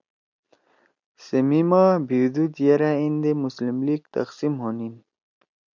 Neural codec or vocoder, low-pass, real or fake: none; 7.2 kHz; real